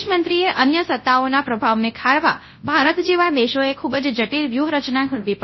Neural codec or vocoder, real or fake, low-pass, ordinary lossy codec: codec, 24 kHz, 0.9 kbps, WavTokenizer, large speech release; fake; 7.2 kHz; MP3, 24 kbps